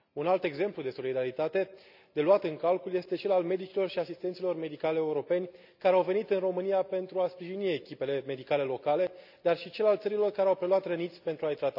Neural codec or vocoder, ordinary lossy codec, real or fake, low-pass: none; none; real; 5.4 kHz